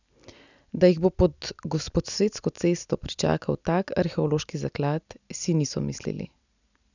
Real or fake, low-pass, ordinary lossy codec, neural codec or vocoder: real; 7.2 kHz; none; none